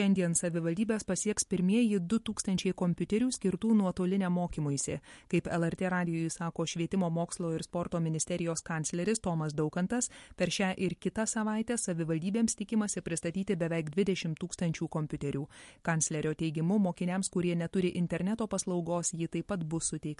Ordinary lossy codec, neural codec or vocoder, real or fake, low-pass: MP3, 48 kbps; none; real; 14.4 kHz